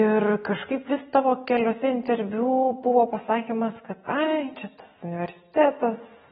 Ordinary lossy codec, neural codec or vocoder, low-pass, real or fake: AAC, 16 kbps; none; 9.9 kHz; real